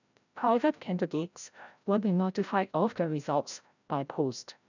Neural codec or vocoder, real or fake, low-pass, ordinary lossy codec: codec, 16 kHz, 0.5 kbps, FreqCodec, larger model; fake; 7.2 kHz; none